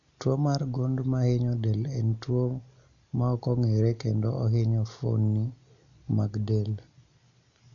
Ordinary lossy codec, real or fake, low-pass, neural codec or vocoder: none; real; 7.2 kHz; none